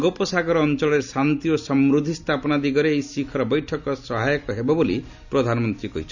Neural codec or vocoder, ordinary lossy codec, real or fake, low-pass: none; none; real; 7.2 kHz